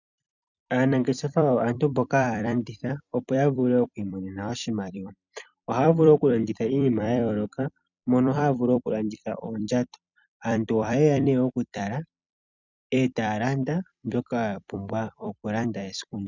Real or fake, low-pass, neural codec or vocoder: fake; 7.2 kHz; vocoder, 44.1 kHz, 128 mel bands every 256 samples, BigVGAN v2